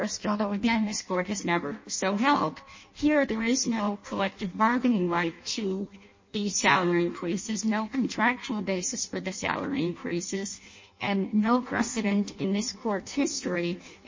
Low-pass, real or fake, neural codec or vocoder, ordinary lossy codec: 7.2 kHz; fake; codec, 16 kHz in and 24 kHz out, 0.6 kbps, FireRedTTS-2 codec; MP3, 32 kbps